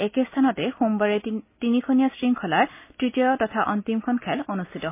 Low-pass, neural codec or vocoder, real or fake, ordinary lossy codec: 3.6 kHz; none; real; MP3, 24 kbps